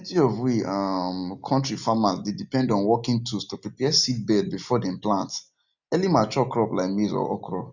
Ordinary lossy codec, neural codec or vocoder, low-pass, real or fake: none; none; 7.2 kHz; real